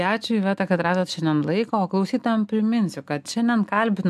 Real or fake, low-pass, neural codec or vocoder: real; 14.4 kHz; none